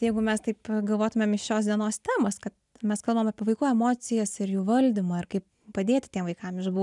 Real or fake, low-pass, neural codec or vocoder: real; 10.8 kHz; none